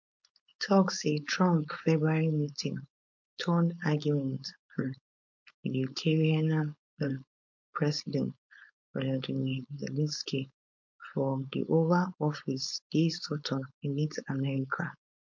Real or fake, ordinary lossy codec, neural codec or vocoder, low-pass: fake; MP3, 48 kbps; codec, 16 kHz, 4.8 kbps, FACodec; 7.2 kHz